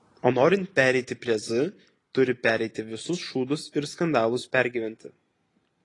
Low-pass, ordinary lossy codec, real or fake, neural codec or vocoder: 10.8 kHz; AAC, 32 kbps; real; none